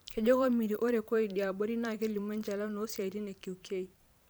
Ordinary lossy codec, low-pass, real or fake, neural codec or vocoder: none; none; fake; vocoder, 44.1 kHz, 128 mel bands every 512 samples, BigVGAN v2